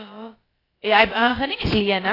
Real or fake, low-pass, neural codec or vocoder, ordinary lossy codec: fake; 5.4 kHz; codec, 16 kHz, about 1 kbps, DyCAST, with the encoder's durations; AAC, 24 kbps